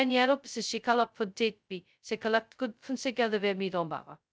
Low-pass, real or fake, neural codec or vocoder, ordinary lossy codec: none; fake; codec, 16 kHz, 0.2 kbps, FocalCodec; none